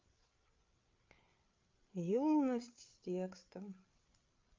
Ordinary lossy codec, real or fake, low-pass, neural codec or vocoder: Opus, 24 kbps; fake; 7.2 kHz; codec, 16 kHz, 8 kbps, FreqCodec, larger model